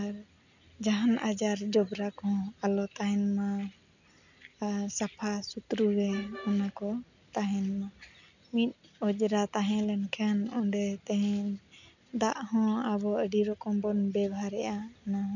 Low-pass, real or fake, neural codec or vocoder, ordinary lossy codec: 7.2 kHz; real; none; none